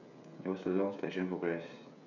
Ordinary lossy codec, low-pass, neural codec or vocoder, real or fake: none; 7.2 kHz; codec, 16 kHz, 16 kbps, FreqCodec, smaller model; fake